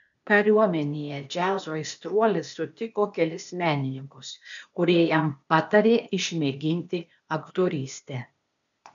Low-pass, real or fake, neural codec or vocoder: 7.2 kHz; fake; codec, 16 kHz, 0.8 kbps, ZipCodec